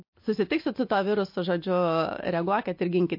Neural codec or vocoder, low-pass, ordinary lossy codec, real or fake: none; 5.4 kHz; MP3, 32 kbps; real